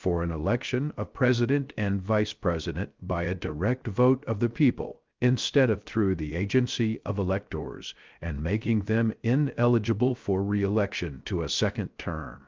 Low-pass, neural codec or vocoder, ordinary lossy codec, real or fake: 7.2 kHz; codec, 16 kHz, 0.3 kbps, FocalCodec; Opus, 24 kbps; fake